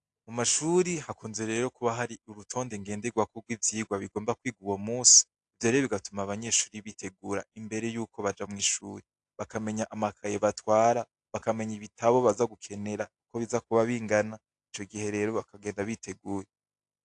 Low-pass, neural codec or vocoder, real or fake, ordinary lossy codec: 10.8 kHz; none; real; AAC, 64 kbps